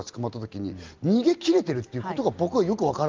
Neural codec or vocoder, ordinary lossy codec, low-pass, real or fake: none; Opus, 24 kbps; 7.2 kHz; real